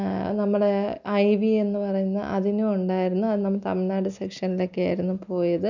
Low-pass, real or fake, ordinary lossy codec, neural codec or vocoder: 7.2 kHz; real; none; none